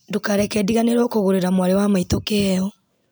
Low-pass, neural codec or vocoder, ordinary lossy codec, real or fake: none; none; none; real